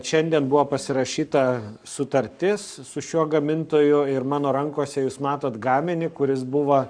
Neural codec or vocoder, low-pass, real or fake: codec, 44.1 kHz, 7.8 kbps, Pupu-Codec; 9.9 kHz; fake